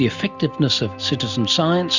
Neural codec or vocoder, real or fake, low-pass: none; real; 7.2 kHz